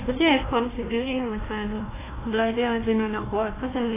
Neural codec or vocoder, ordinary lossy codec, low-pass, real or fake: codec, 16 kHz, 1 kbps, FunCodec, trained on Chinese and English, 50 frames a second; AAC, 16 kbps; 3.6 kHz; fake